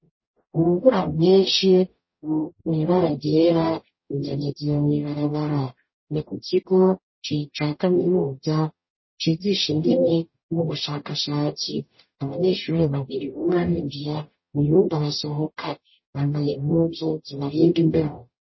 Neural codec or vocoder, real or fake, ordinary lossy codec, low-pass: codec, 44.1 kHz, 0.9 kbps, DAC; fake; MP3, 24 kbps; 7.2 kHz